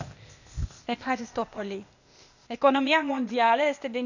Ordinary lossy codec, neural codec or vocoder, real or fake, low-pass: none; codec, 16 kHz, 0.8 kbps, ZipCodec; fake; 7.2 kHz